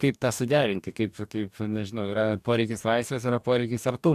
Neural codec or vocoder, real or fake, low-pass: codec, 44.1 kHz, 2.6 kbps, DAC; fake; 14.4 kHz